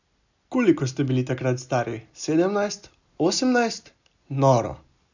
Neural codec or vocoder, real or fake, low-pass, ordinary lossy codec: none; real; 7.2 kHz; none